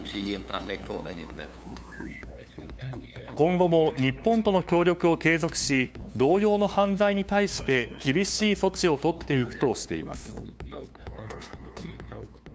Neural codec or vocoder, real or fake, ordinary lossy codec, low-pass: codec, 16 kHz, 2 kbps, FunCodec, trained on LibriTTS, 25 frames a second; fake; none; none